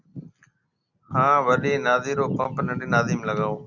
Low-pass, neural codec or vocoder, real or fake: 7.2 kHz; none; real